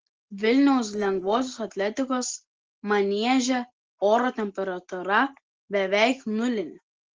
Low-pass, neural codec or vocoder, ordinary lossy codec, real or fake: 7.2 kHz; none; Opus, 16 kbps; real